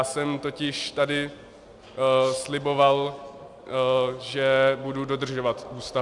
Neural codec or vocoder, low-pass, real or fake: none; 10.8 kHz; real